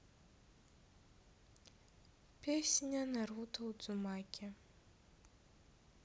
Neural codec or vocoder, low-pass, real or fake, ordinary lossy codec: none; none; real; none